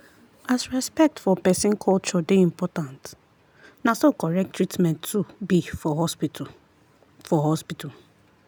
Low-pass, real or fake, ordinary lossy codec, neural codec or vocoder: none; real; none; none